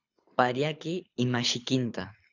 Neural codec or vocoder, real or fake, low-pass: codec, 24 kHz, 6 kbps, HILCodec; fake; 7.2 kHz